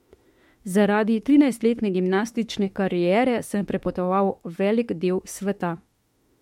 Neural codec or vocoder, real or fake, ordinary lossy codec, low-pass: autoencoder, 48 kHz, 32 numbers a frame, DAC-VAE, trained on Japanese speech; fake; MP3, 64 kbps; 19.8 kHz